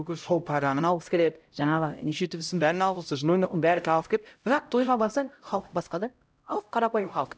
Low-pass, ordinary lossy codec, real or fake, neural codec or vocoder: none; none; fake; codec, 16 kHz, 0.5 kbps, X-Codec, HuBERT features, trained on LibriSpeech